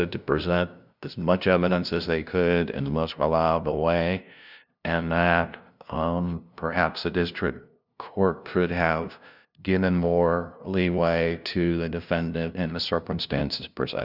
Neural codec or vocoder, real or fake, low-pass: codec, 16 kHz, 0.5 kbps, FunCodec, trained on LibriTTS, 25 frames a second; fake; 5.4 kHz